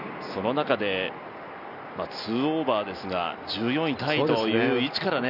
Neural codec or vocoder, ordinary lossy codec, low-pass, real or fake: none; none; 5.4 kHz; real